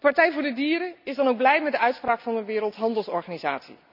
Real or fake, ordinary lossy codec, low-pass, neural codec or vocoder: real; none; 5.4 kHz; none